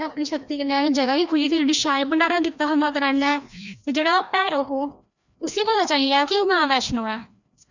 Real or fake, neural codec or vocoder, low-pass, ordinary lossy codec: fake; codec, 16 kHz, 1 kbps, FreqCodec, larger model; 7.2 kHz; none